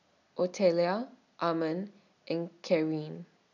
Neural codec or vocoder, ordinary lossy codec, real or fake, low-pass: none; none; real; 7.2 kHz